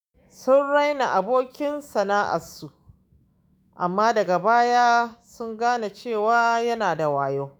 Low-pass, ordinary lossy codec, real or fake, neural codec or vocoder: none; none; fake; autoencoder, 48 kHz, 128 numbers a frame, DAC-VAE, trained on Japanese speech